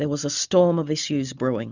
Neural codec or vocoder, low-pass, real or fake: none; 7.2 kHz; real